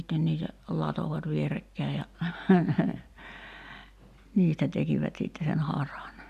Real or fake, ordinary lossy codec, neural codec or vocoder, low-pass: real; none; none; 14.4 kHz